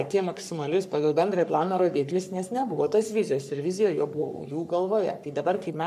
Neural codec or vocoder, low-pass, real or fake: codec, 44.1 kHz, 3.4 kbps, Pupu-Codec; 14.4 kHz; fake